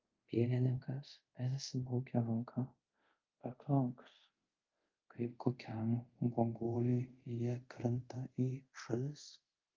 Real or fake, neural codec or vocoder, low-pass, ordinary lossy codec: fake; codec, 24 kHz, 0.5 kbps, DualCodec; 7.2 kHz; Opus, 32 kbps